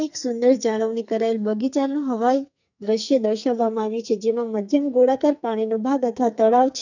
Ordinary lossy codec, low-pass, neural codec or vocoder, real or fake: none; 7.2 kHz; codec, 44.1 kHz, 2.6 kbps, SNAC; fake